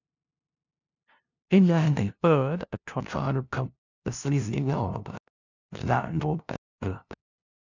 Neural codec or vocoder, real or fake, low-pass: codec, 16 kHz, 0.5 kbps, FunCodec, trained on LibriTTS, 25 frames a second; fake; 7.2 kHz